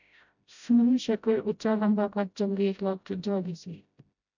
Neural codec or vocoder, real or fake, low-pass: codec, 16 kHz, 0.5 kbps, FreqCodec, smaller model; fake; 7.2 kHz